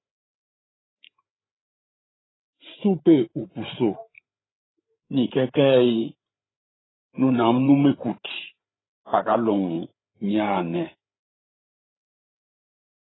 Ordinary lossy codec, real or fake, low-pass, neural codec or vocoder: AAC, 16 kbps; fake; 7.2 kHz; codec, 16 kHz, 8 kbps, FreqCodec, larger model